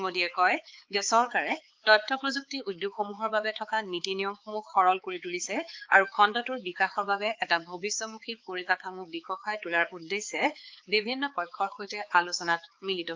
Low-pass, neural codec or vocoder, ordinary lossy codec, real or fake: 7.2 kHz; codec, 16 kHz, 4 kbps, X-Codec, HuBERT features, trained on balanced general audio; Opus, 24 kbps; fake